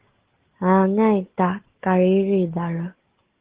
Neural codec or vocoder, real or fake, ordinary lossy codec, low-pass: none; real; Opus, 16 kbps; 3.6 kHz